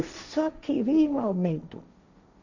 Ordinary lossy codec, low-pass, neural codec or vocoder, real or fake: none; 7.2 kHz; codec, 16 kHz, 1.1 kbps, Voila-Tokenizer; fake